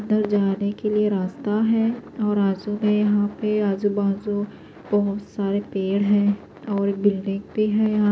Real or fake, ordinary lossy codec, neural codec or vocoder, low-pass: real; none; none; none